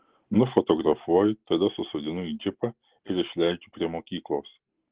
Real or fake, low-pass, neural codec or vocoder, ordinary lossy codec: real; 3.6 kHz; none; Opus, 16 kbps